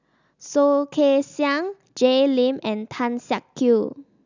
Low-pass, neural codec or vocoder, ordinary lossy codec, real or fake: 7.2 kHz; none; none; real